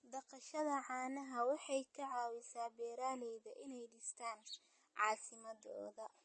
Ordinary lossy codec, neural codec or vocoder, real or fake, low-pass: MP3, 32 kbps; none; real; 9.9 kHz